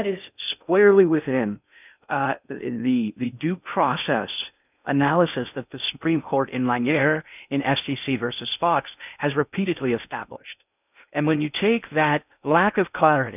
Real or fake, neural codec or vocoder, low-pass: fake; codec, 16 kHz in and 24 kHz out, 0.6 kbps, FocalCodec, streaming, 2048 codes; 3.6 kHz